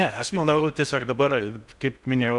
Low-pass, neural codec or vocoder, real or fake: 10.8 kHz; codec, 16 kHz in and 24 kHz out, 0.6 kbps, FocalCodec, streaming, 4096 codes; fake